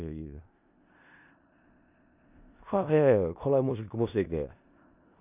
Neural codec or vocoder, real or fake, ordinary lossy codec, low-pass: codec, 16 kHz in and 24 kHz out, 0.4 kbps, LongCat-Audio-Codec, four codebook decoder; fake; none; 3.6 kHz